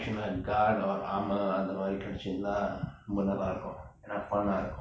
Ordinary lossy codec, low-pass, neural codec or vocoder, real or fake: none; none; none; real